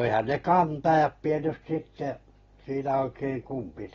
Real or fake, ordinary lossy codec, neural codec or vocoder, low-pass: real; AAC, 24 kbps; none; 7.2 kHz